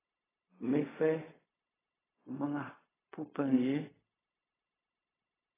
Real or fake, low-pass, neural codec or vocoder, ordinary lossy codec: fake; 3.6 kHz; codec, 16 kHz, 0.4 kbps, LongCat-Audio-Codec; AAC, 16 kbps